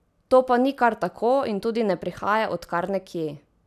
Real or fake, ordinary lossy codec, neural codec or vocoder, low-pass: real; none; none; 14.4 kHz